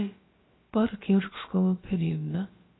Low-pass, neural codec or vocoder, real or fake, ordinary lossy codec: 7.2 kHz; codec, 16 kHz, about 1 kbps, DyCAST, with the encoder's durations; fake; AAC, 16 kbps